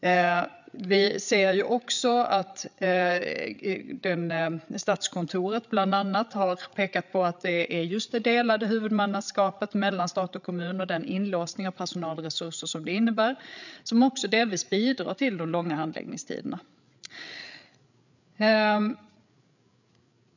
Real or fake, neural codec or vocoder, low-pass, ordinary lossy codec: fake; codec, 16 kHz, 8 kbps, FreqCodec, larger model; 7.2 kHz; none